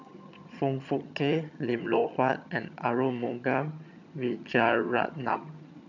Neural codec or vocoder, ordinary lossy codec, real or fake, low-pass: vocoder, 22.05 kHz, 80 mel bands, HiFi-GAN; none; fake; 7.2 kHz